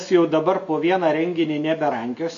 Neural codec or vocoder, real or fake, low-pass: none; real; 7.2 kHz